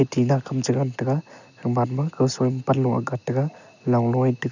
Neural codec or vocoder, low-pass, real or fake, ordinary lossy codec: none; 7.2 kHz; real; none